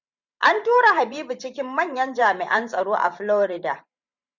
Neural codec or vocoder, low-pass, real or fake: vocoder, 44.1 kHz, 128 mel bands every 512 samples, BigVGAN v2; 7.2 kHz; fake